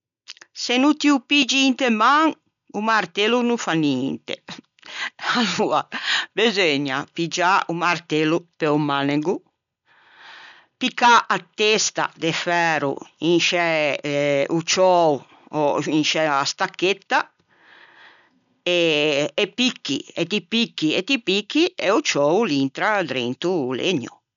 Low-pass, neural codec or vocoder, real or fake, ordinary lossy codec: 7.2 kHz; none; real; none